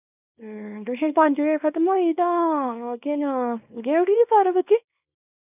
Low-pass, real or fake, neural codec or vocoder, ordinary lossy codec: 3.6 kHz; fake; codec, 24 kHz, 0.9 kbps, WavTokenizer, small release; none